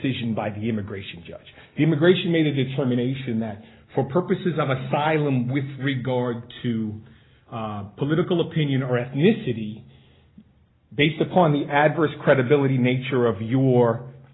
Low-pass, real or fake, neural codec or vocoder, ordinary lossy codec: 7.2 kHz; real; none; AAC, 16 kbps